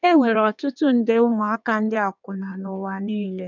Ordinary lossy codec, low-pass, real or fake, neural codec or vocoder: none; 7.2 kHz; fake; codec, 16 kHz in and 24 kHz out, 1.1 kbps, FireRedTTS-2 codec